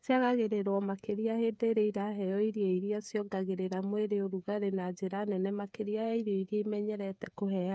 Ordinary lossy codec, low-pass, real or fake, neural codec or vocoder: none; none; fake; codec, 16 kHz, 8 kbps, FreqCodec, smaller model